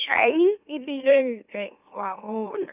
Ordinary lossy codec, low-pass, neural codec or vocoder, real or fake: none; 3.6 kHz; autoencoder, 44.1 kHz, a latent of 192 numbers a frame, MeloTTS; fake